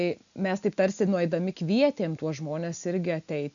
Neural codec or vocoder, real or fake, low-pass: none; real; 7.2 kHz